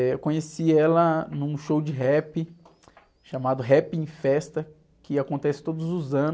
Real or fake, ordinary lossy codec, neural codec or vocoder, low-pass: real; none; none; none